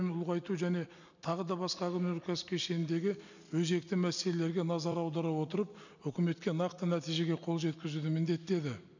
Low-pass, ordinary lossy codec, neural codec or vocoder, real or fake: 7.2 kHz; none; none; real